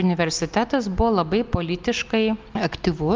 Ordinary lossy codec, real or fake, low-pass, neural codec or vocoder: Opus, 32 kbps; real; 7.2 kHz; none